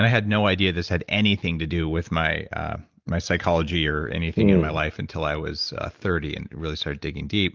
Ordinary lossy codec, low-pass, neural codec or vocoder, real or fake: Opus, 24 kbps; 7.2 kHz; none; real